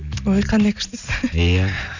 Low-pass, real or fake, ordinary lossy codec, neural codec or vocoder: 7.2 kHz; real; none; none